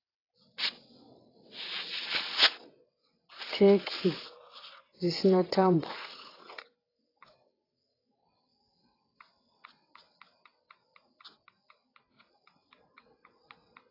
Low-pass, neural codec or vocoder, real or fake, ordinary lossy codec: 5.4 kHz; vocoder, 22.05 kHz, 80 mel bands, WaveNeXt; fake; AAC, 24 kbps